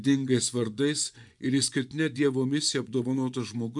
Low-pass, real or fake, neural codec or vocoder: 10.8 kHz; fake; vocoder, 24 kHz, 100 mel bands, Vocos